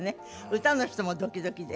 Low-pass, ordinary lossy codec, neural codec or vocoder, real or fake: none; none; none; real